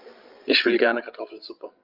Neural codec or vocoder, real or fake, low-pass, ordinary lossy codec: codec, 16 kHz, 16 kbps, FreqCodec, larger model; fake; 5.4 kHz; Opus, 32 kbps